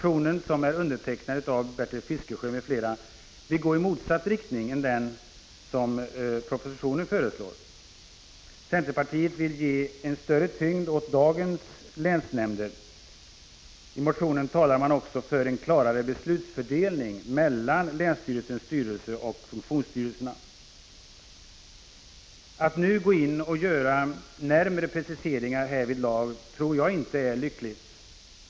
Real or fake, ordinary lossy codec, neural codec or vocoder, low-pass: real; none; none; none